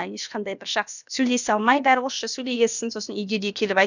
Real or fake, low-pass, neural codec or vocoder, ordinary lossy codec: fake; 7.2 kHz; codec, 16 kHz, about 1 kbps, DyCAST, with the encoder's durations; none